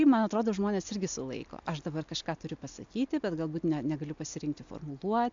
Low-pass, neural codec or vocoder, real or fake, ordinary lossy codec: 7.2 kHz; none; real; AAC, 64 kbps